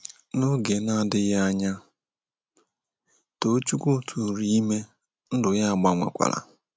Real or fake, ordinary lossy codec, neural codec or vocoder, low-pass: real; none; none; none